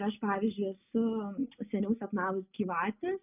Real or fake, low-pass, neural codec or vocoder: real; 3.6 kHz; none